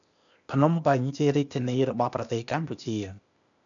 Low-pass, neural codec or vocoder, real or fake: 7.2 kHz; codec, 16 kHz, 0.8 kbps, ZipCodec; fake